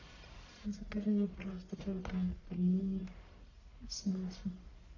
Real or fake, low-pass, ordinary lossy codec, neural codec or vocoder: fake; 7.2 kHz; AAC, 48 kbps; codec, 44.1 kHz, 1.7 kbps, Pupu-Codec